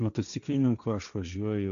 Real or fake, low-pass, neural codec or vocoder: fake; 7.2 kHz; codec, 16 kHz, 1.1 kbps, Voila-Tokenizer